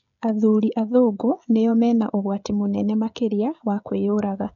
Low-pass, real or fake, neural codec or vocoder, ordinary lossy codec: 7.2 kHz; fake; codec, 16 kHz, 16 kbps, FreqCodec, smaller model; none